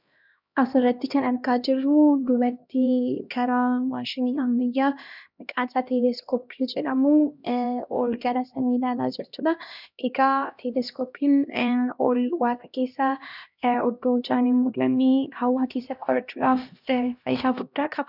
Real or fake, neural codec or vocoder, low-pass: fake; codec, 16 kHz, 1 kbps, X-Codec, HuBERT features, trained on LibriSpeech; 5.4 kHz